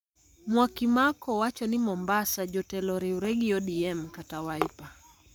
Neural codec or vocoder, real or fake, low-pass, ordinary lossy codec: codec, 44.1 kHz, 7.8 kbps, Pupu-Codec; fake; none; none